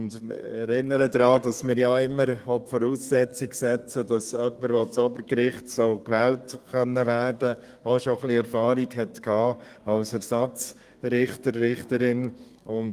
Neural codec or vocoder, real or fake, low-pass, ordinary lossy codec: codec, 32 kHz, 1.9 kbps, SNAC; fake; 14.4 kHz; Opus, 32 kbps